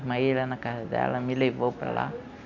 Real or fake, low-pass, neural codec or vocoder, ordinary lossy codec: real; 7.2 kHz; none; none